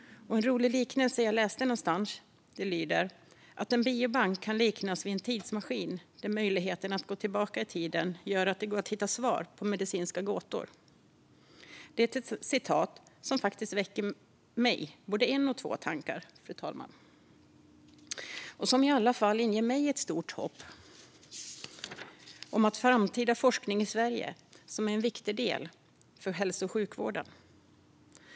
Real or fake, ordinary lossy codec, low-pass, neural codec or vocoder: real; none; none; none